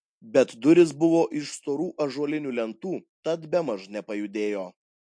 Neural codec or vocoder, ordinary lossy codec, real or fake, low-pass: none; MP3, 48 kbps; real; 9.9 kHz